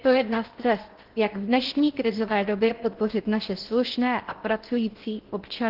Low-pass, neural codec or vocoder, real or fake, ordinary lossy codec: 5.4 kHz; codec, 16 kHz in and 24 kHz out, 0.6 kbps, FocalCodec, streaming, 2048 codes; fake; Opus, 16 kbps